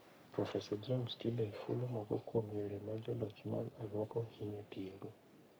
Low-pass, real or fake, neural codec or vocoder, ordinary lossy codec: none; fake; codec, 44.1 kHz, 3.4 kbps, Pupu-Codec; none